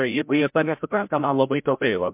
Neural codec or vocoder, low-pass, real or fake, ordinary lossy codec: codec, 16 kHz, 0.5 kbps, FreqCodec, larger model; 3.6 kHz; fake; MP3, 32 kbps